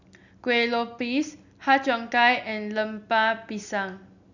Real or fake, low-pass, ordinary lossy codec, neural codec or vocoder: real; 7.2 kHz; none; none